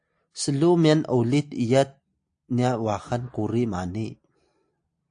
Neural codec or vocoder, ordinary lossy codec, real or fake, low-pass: none; AAC, 64 kbps; real; 9.9 kHz